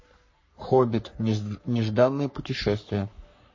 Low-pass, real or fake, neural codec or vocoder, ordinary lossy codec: 7.2 kHz; fake; codec, 44.1 kHz, 3.4 kbps, Pupu-Codec; MP3, 32 kbps